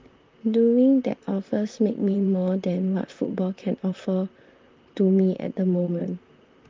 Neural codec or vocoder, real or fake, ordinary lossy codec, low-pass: vocoder, 44.1 kHz, 128 mel bands, Pupu-Vocoder; fake; Opus, 32 kbps; 7.2 kHz